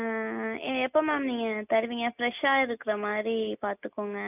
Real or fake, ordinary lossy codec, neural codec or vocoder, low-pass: real; none; none; 3.6 kHz